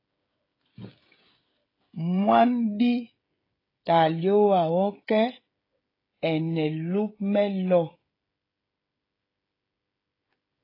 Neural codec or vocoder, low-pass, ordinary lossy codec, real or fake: codec, 16 kHz, 16 kbps, FreqCodec, smaller model; 5.4 kHz; AAC, 24 kbps; fake